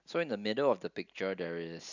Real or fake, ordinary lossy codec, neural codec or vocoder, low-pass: real; none; none; 7.2 kHz